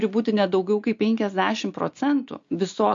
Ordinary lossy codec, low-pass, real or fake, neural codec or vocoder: MP3, 48 kbps; 7.2 kHz; real; none